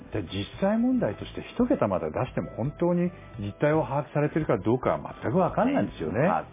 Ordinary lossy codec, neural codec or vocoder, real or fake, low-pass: MP3, 16 kbps; none; real; 3.6 kHz